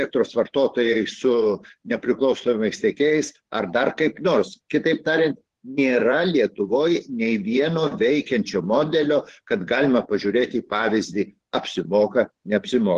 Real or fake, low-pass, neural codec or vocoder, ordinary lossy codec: fake; 10.8 kHz; vocoder, 24 kHz, 100 mel bands, Vocos; Opus, 16 kbps